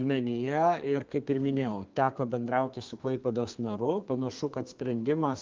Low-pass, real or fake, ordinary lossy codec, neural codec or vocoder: 7.2 kHz; fake; Opus, 32 kbps; codec, 32 kHz, 1.9 kbps, SNAC